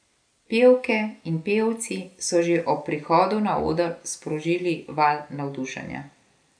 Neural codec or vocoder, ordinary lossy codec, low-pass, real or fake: none; none; 9.9 kHz; real